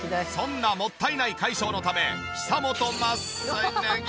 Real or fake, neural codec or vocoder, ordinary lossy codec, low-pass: real; none; none; none